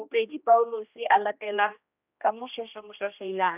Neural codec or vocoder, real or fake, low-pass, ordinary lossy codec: codec, 16 kHz, 1 kbps, X-Codec, HuBERT features, trained on general audio; fake; 3.6 kHz; none